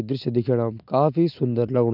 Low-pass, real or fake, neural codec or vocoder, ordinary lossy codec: 5.4 kHz; real; none; none